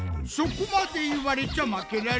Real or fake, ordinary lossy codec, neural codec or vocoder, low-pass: real; none; none; none